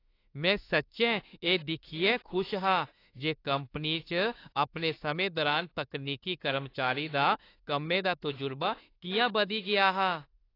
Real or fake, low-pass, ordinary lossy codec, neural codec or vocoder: fake; 5.4 kHz; AAC, 32 kbps; autoencoder, 48 kHz, 32 numbers a frame, DAC-VAE, trained on Japanese speech